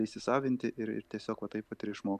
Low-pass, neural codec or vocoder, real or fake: 14.4 kHz; none; real